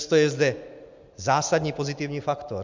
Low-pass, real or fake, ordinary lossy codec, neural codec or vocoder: 7.2 kHz; real; MP3, 64 kbps; none